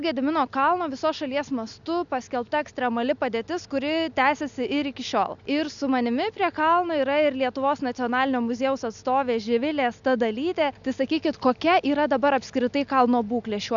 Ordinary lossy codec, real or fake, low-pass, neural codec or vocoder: MP3, 96 kbps; real; 7.2 kHz; none